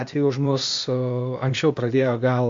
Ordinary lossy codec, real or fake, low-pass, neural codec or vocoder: MP3, 48 kbps; fake; 7.2 kHz; codec, 16 kHz, 0.8 kbps, ZipCodec